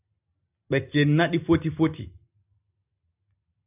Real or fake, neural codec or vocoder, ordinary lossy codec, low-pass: real; none; AAC, 32 kbps; 3.6 kHz